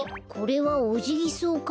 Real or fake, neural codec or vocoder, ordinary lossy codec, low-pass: real; none; none; none